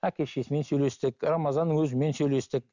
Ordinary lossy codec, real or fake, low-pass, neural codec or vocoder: none; real; 7.2 kHz; none